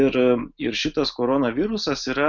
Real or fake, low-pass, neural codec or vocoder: real; 7.2 kHz; none